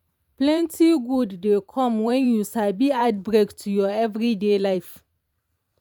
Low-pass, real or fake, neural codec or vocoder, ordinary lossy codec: 19.8 kHz; fake; vocoder, 44.1 kHz, 128 mel bands every 512 samples, BigVGAN v2; none